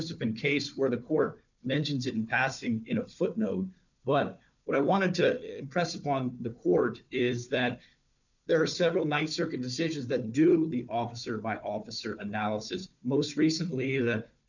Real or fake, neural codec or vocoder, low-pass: fake; codec, 16 kHz, 4 kbps, FunCodec, trained on Chinese and English, 50 frames a second; 7.2 kHz